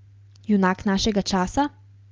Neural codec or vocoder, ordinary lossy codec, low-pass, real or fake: none; Opus, 24 kbps; 7.2 kHz; real